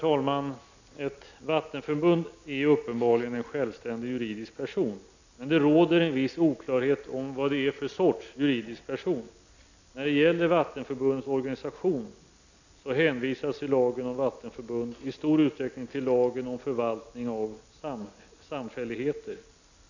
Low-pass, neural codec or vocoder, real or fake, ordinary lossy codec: 7.2 kHz; none; real; none